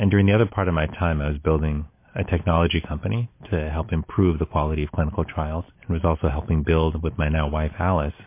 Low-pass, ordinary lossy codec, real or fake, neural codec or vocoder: 3.6 kHz; MP3, 24 kbps; real; none